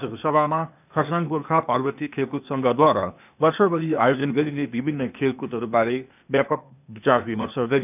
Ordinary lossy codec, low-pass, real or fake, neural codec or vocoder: none; 3.6 kHz; fake; codec, 16 kHz, 0.8 kbps, ZipCodec